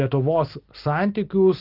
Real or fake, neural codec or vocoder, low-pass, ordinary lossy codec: real; none; 5.4 kHz; Opus, 32 kbps